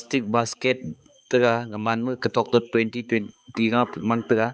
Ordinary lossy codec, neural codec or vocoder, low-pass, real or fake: none; codec, 16 kHz, 4 kbps, X-Codec, HuBERT features, trained on balanced general audio; none; fake